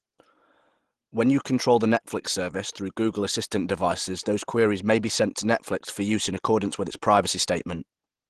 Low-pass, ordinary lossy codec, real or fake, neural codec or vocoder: 10.8 kHz; Opus, 16 kbps; real; none